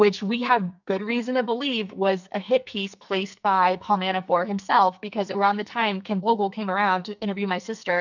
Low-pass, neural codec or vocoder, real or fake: 7.2 kHz; codec, 44.1 kHz, 2.6 kbps, SNAC; fake